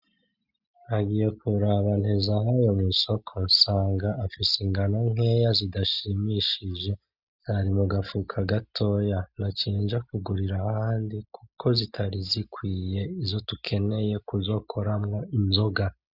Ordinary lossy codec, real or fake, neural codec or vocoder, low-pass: Opus, 64 kbps; real; none; 5.4 kHz